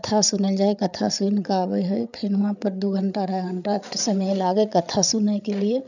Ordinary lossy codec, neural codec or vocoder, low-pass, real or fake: none; codec, 16 kHz, 8 kbps, FreqCodec, larger model; 7.2 kHz; fake